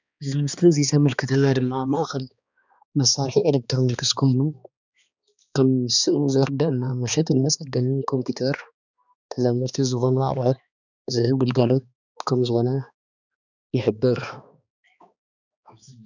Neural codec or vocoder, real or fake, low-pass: codec, 16 kHz, 2 kbps, X-Codec, HuBERT features, trained on balanced general audio; fake; 7.2 kHz